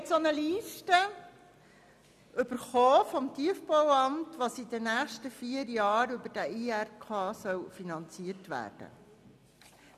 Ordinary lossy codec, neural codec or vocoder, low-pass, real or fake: none; none; 14.4 kHz; real